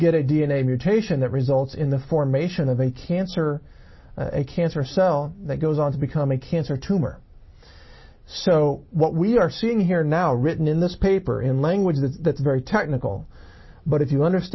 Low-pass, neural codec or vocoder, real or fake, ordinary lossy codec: 7.2 kHz; none; real; MP3, 24 kbps